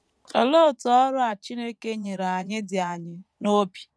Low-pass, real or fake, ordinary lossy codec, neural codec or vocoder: none; fake; none; vocoder, 22.05 kHz, 80 mel bands, Vocos